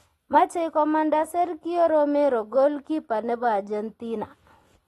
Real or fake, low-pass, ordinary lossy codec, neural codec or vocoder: real; 19.8 kHz; AAC, 32 kbps; none